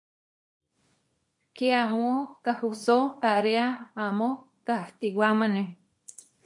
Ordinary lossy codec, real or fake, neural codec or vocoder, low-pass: MP3, 48 kbps; fake; codec, 24 kHz, 0.9 kbps, WavTokenizer, small release; 10.8 kHz